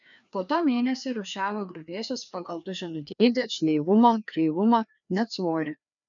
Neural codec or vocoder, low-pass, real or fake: codec, 16 kHz, 2 kbps, FreqCodec, larger model; 7.2 kHz; fake